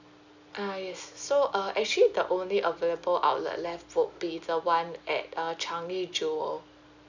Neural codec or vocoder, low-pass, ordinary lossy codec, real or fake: none; 7.2 kHz; none; real